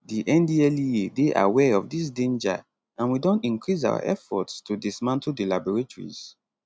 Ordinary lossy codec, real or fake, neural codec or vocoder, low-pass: none; real; none; none